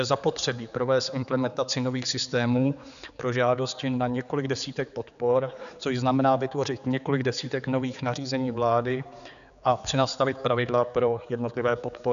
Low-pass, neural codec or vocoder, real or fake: 7.2 kHz; codec, 16 kHz, 4 kbps, X-Codec, HuBERT features, trained on general audio; fake